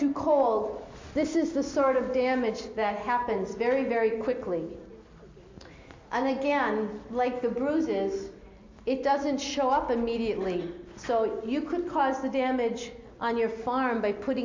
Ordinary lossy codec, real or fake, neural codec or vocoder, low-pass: MP3, 48 kbps; real; none; 7.2 kHz